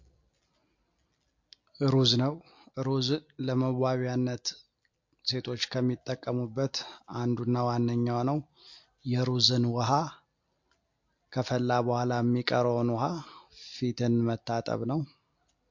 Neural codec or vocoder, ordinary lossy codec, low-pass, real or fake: none; MP3, 48 kbps; 7.2 kHz; real